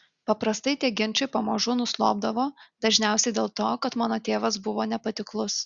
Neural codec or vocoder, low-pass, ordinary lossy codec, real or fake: none; 7.2 kHz; Opus, 64 kbps; real